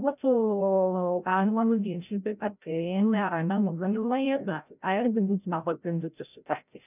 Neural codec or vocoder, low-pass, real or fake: codec, 16 kHz, 0.5 kbps, FreqCodec, larger model; 3.6 kHz; fake